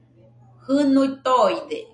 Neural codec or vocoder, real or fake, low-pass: none; real; 9.9 kHz